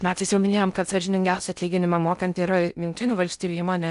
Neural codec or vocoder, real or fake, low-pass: codec, 16 kHz in and 24 kHz out, 0.6 kbps, FocalCodec, streaming, 4096 codes; fake; 10.8 kHz